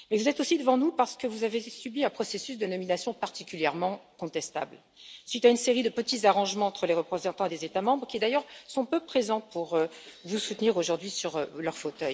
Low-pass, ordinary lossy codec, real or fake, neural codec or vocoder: none; none; real; none